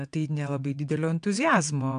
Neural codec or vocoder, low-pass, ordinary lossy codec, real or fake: vocoder, 22.05 kHz, 80 mel bands, WaveNeXt; 9.9 kHz; AAC, 64 kbps; fake